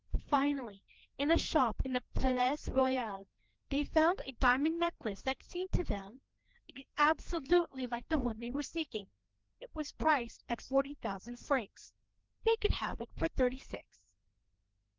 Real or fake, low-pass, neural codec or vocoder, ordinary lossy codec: fake; 7.2 kHz; codec, 16 kHz, 2 kbps, FreqCodec, larger model; Opus, 16 kbps